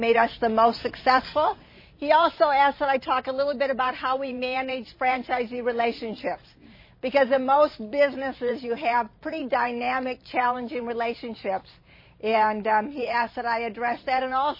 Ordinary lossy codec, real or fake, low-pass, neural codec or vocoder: MP3, 24 kbps; fake; 5.4 kHz; vocoder, 44.1 kHz, 128 mel bands every 512 samples, BigVGAN v2